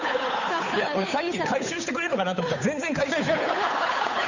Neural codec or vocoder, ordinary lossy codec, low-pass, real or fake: codec, 16 kHz, 8 kbps, FunCodec, trained on Chinese and English, 25 frames a second; none; 7.2 kHz; fake